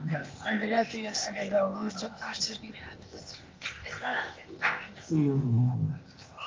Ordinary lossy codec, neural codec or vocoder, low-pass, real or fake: Opus, 24 kbps; codec, 16 kHz, 0.8 kbps, ZipCodec; 7.2 kHz; fake